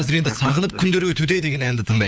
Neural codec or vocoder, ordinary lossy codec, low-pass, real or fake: codec, 16 kHz, 4 kbps, FunCodec, trained on LibriTTS, 50 frames a second; none; none; fake